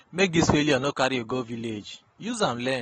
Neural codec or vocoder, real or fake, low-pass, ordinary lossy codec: none; real; 14.4 kHz; AAC, 24 kbps